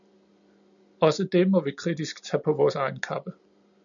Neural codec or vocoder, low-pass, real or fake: none; 7.2 kHz; real